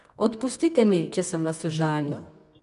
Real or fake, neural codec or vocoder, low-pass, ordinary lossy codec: fake; codec, 24 kHz, 0.9 kbps, WavTokenizer, medium music audio release; 10.8 kHz; none